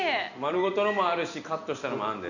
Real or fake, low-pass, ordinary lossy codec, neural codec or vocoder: real; 7.2 kHz; none; none